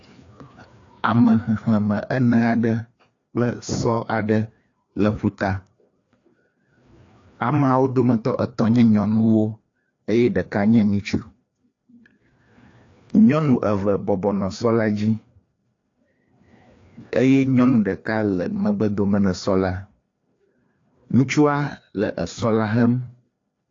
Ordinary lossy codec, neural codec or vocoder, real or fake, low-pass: AAC, 48 kbps; codec, 16 kHz, 2 kbps, FreqCodec, larger model; fake; 7.2 kHz